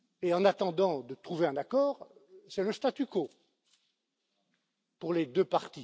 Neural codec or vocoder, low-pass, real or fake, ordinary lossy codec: none; none; real; none